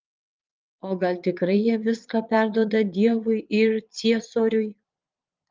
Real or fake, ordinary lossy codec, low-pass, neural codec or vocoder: fake; Opus, 32 kbps; 7.2 kHz; vocoder, 22.05 kHz, 80 mel bands, Vocos